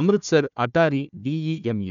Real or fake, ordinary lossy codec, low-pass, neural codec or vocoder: fake; none; 7.2 kHz; codec, 16 kHz, 1 kbps, FunCodec, trained on Chinese and English, 50 frames a second